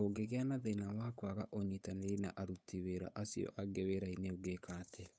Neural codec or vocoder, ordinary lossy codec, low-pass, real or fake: codec, 16 kHz, 8 kbps, FunCodec, trained on Chinese and English, 25 frames a second; none; none; fake